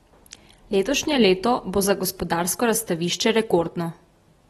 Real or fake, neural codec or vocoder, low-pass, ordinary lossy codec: real; none; 14.4 kHz; AAC, 32 kbps